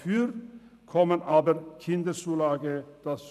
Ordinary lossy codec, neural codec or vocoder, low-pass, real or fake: none; vocoder, 44.1 kHz, 128 mel bands every 512 samples, BigVGAN v2; 14.4 kHz; fake